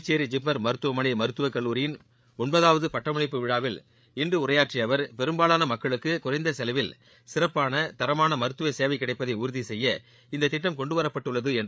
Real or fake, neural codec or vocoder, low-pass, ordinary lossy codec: fake; codec, 16 kHz, 8 kbps, FreqCodec, larger model; none; none